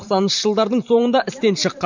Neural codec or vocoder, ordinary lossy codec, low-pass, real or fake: vocoder, 44.1 kHz, 128 mel bands every 512 samples, BigVGAN v2; none; 7.2 kHz; fake